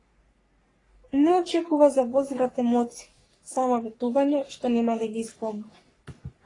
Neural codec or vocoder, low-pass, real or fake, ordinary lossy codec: codec, 44.1 kHz, 3.4 kbps, Pupu-Codec; 10.8 kHz; fake; AAC, 32 kbps